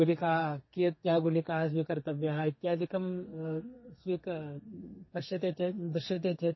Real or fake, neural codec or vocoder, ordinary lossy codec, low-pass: fake; codec, 32 kHz, 1.9 kbps, SNAC; MP3, 24 kbps; 7.2 kHz